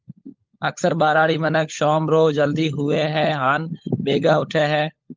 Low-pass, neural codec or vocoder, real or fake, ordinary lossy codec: 7.2 kHz; codec, 16 kHz, 16 kbps, FunCodec, trained on LibriTTS, 50 frames a second; fake; Opus, 24 kbps